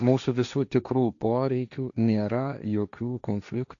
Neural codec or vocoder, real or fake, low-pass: codec, 16 kHz, 1.1 kbps, Voila-Tokenizer; fake; 7.2 kHz